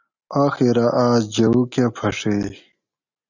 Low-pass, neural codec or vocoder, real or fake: 7.2 kHz; none; real